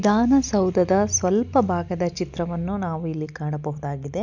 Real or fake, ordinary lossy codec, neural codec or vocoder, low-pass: real; none; none; 7.2 kHz